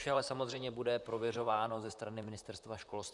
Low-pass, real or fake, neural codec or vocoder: 10.8 kHz; fake; vocoder, 44.1 kHz, 128 mel bands, Pupu-Vocoder